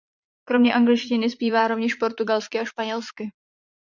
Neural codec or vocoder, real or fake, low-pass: vocoder, 44.1 kHz, 80 mel bands, Vocos; fake; 7.2 kHz